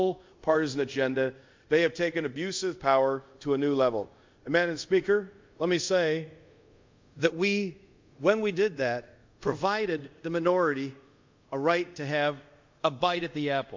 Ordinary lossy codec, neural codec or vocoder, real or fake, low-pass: MP3, 64 kbps; codec, 24 kHz, 0.5 kbps, DualCodec; fake; 7.2 kHz